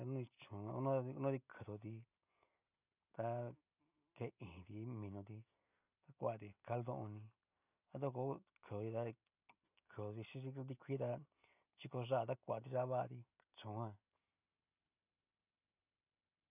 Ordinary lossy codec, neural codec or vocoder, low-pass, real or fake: none; none; 3.6 kHz; real